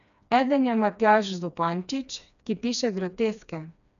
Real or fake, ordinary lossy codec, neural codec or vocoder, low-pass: fake; none; codec, 16 kHz, 2 kbps, FreqCodec, smaller model; 7.2 kHz